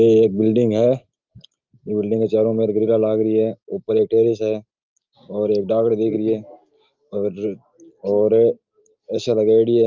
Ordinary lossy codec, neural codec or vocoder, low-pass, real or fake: Opus, 24 kbps; none; 7.2 kHz; real